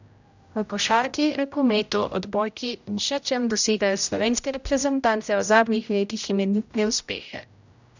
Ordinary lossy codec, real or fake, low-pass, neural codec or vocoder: none; fake; 7.2 kHz; codec, 16 kHz, 0.5 kbps, X-Codec, HuBERT features, trained on general audio